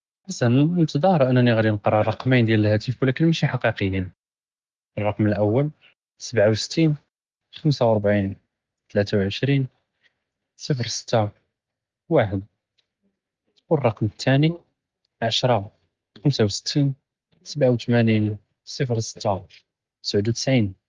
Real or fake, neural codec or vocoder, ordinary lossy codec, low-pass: real; none; Opus, 32 kbps; 7.2 kHz